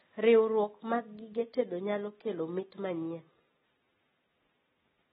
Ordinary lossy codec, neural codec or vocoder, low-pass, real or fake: AAC, 16 kbps; none; 10.8 kHz; real